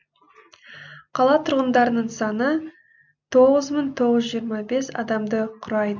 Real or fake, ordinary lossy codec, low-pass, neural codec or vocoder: real; none; 7.2 kHz; none